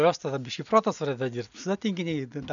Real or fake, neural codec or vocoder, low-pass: real; none; 7.2 kHz